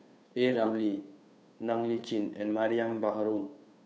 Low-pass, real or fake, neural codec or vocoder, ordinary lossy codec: none; fake; codec, 16 kHz, 2 kbps, FunCodec, trained on Chinese and English, 25 frames a second; none